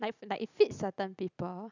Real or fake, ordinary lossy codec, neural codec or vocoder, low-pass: real; none; none; 7.2 kHz